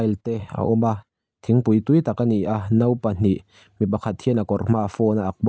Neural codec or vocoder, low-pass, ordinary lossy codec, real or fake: none; none; none; real